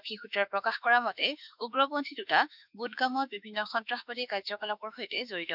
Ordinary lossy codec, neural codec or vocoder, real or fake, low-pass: none; autoencoder, 48 kHz, 32 numbers a frame, DAC-VAE, trained on Japanese speech; fake; 5.4 kHz